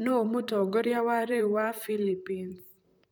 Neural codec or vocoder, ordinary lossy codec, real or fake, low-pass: vocoder, 44.1 kHz, 128 mel bands, Pupu-Vocoder; none; fake; none